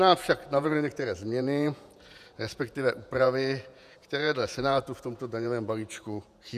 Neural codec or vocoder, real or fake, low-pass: vocoder, 48 kHz, 128 mel bands, Vocos; fake; 14.4 kHz